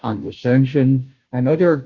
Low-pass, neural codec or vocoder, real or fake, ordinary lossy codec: 7.2 kHz; codec, 16 kHz, 0.5 kbps, FunCodec, trained on Chinese and English, 25 frames a second; fake; Opus, 64 kbps